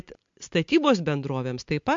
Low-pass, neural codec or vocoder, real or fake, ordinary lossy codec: 7.2 kHz; none; real; MP3, 48 kbps